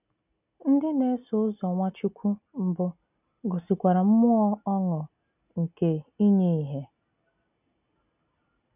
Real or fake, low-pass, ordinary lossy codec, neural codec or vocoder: real; 3.6 kHz; none; none